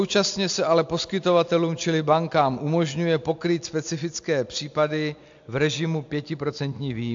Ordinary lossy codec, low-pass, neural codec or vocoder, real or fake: AAC, 64 kbps; 7.2 kHz; none; real